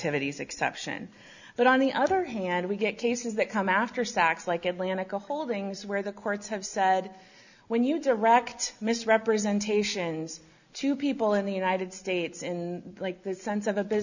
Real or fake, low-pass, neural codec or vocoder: real; 7.2 kHz; none